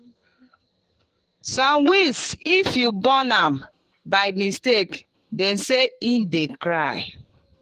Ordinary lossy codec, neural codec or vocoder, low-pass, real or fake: Opus, 24 kbps; codec, 44.1 kHz, 2.6 kbps, SNAC; 14.4 kHz; fake